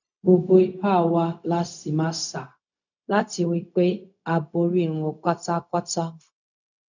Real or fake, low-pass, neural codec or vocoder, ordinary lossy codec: fake; 7.2 kHz; codec, 16 kHz, 0.4 kbps, LongCat-Audio-Codec; none